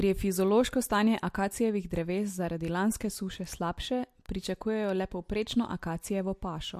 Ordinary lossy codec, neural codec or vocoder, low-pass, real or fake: MP3, 64 kbps; none; 14.4 kHz; real